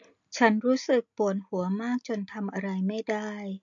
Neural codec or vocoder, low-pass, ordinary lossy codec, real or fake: none; 7.2 kHz; MP3, 48 kbps; real